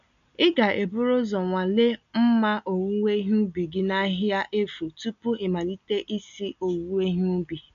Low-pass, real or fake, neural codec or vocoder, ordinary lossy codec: 7.2 kHz; real; none; none